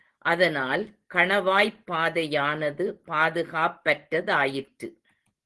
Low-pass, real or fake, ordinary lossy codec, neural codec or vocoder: 10.8 kHz; real; Opus, 16 kbps; none